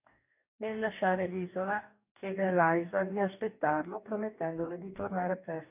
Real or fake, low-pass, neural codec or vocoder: fake; 3.6 kHz; codec, 44.1 kHz, 2.6 kbps, DAC